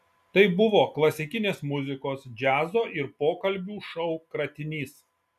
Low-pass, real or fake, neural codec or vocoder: 14.4 kHz; real; none